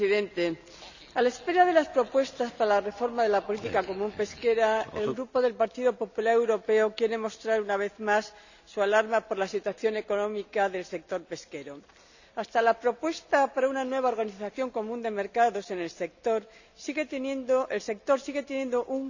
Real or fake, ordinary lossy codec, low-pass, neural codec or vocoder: real; none; 7.2 kHz; none